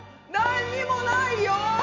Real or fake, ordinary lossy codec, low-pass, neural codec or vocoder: real; MP3, 64 kbps; 7.2 kHz; none